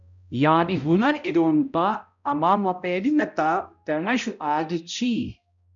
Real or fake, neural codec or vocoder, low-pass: fake; codec, 16 kHz, 0.5 kbps, X-Codec, HuBERT features, trained on balanced general audio; 7.2 kHz